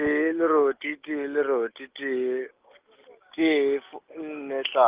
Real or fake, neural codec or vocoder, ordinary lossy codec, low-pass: real; none; Opus, 32 kbps; 3.6 kHz